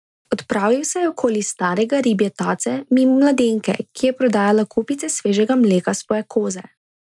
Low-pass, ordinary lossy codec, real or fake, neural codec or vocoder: 10.8 kHz; none; real; none